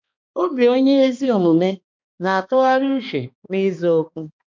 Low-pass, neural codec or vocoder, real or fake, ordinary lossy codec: 7.2 kHz; codec, 16 kHz, 2 kbps, X-Codec, HuBERT features, trained on balanced general audio; fake; MP3, 48 kbps